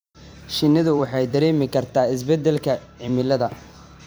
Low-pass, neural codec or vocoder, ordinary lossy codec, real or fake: none; vocoder, 44.1 kHz, 128 mel bands every 256 samples, BigVGAN v2; none; fake